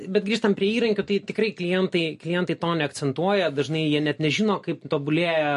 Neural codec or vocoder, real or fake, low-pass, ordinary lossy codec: none; real; 14.4 kHz; MP3, 48 kbps